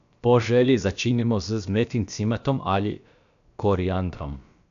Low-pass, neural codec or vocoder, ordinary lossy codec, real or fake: 7.2 kHz; codec, 16 kHz, about 1 kbps, DyCAST, with the encoder's durations; none; fake